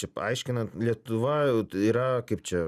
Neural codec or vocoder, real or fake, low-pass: none; real; 14.4 kHz